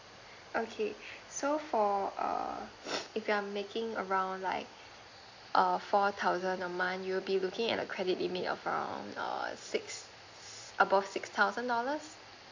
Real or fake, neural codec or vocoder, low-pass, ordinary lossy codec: real; none; 7.2 kHz; AAC, 48 kbps